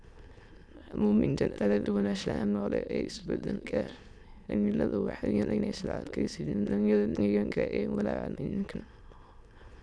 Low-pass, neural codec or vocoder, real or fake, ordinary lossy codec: none; autoencoder, 22.05 kHz, a latent of 192 numbers a frame, VITS, trained on many speakers; fake; none